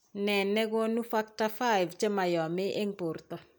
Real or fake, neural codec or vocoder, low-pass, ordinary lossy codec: real; none; none; none